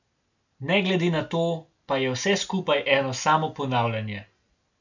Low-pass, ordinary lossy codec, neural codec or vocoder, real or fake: 7.2 kHz; none; none; real